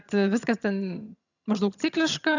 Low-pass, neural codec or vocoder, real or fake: 7.2 kHz; vocoder, 22.05 kHz, 80 mel bands, HiFi-GAN; fake